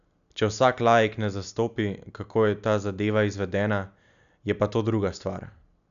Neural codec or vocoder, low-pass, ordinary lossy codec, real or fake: none; 7.2 kHz; none; real